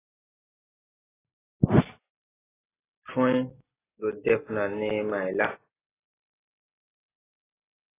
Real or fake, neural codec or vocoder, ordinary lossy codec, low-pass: real; none; AAC, 16 kbps; 3.6 kHz